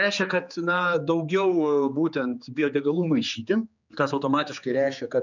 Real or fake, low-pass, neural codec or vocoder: fake; 7.2 kHz; codec, 16 kHz, 4 kbps, X-Codec, HuBERT features, trained on general audio